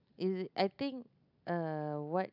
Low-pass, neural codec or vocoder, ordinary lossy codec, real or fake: 5.4 kHz; none; none; real